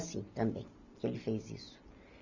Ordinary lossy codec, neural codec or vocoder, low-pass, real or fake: none; none; 7.2 kHz; real